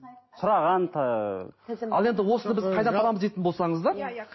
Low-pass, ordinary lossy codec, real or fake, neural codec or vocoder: 7.2 kHz; MP3, 24 kbps; real; none